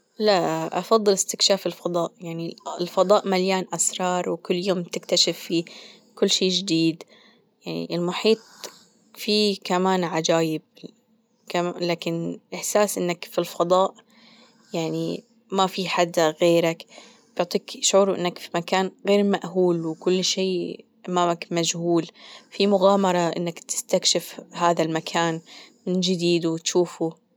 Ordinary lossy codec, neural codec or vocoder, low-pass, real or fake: none; none; none; real